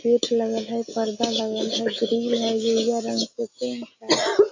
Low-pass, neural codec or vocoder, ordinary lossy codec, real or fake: 7.2 kHz; none; AAC, 48 kbps; real